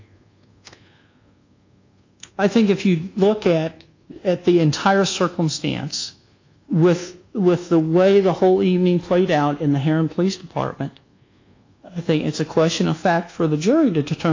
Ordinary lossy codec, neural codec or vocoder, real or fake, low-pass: AAC, 48 kbps; codec, 24 kHz, 1.2 kbps, DualCodec; fake; 7.2 kHz